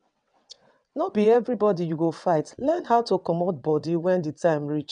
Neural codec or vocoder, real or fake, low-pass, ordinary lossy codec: vocoder, 22.05 kHz, 80 mel bands, WaveNeXt; fake; 9.9 kHz; none